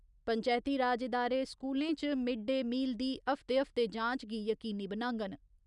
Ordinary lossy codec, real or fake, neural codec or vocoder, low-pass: none; real; none; 10.8 kHz